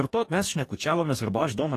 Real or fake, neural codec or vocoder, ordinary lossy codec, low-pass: fake; codec, 44.1 kHz, 2.6 kbps, DAC; AAC, 48 kbps; 14.4 kHz